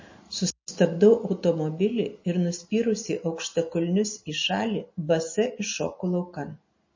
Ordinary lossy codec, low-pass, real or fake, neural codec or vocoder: MP3, 32 kbps; 7.2 kHz; real; none